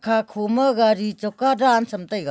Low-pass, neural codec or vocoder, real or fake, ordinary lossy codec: none; none; real; none